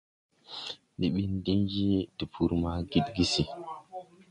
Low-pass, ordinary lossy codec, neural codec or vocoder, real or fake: 10.8 kHz; MP3, 64 kbps; none; real